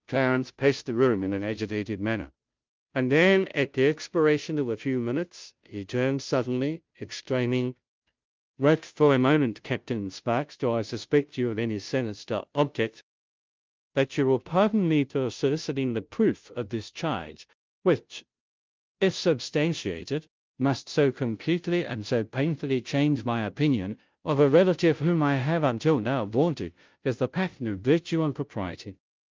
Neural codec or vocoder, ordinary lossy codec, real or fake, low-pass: codec, 16 kHz, 0.5 kbps, FunCodec, trained on Chinese and English, 25 frames a second; Opus, 24 kbps; fake; 7.2 kHz